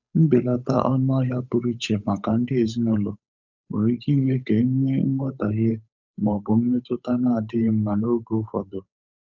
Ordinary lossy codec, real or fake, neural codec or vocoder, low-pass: none; fake; codec, 16 kHz, 8 kbps, FunCodec, trained on Chinese and English, 25 frames a second; 7.2 kHz